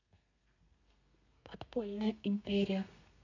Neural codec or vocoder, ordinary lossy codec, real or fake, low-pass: codec, 32 kHz, 1.9 kbps, SNAC; none; fake; 7.2 kHz